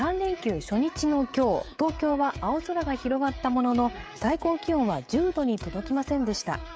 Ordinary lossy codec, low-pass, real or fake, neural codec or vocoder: none; none; fake; codec, 16 kHz, 16 kbps, FreqCodec, smaller model